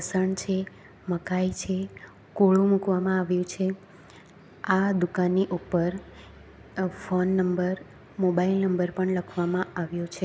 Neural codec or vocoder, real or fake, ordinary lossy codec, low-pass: none; real; none; none